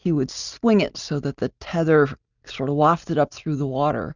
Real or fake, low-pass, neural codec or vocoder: real; 7.2 kHz; none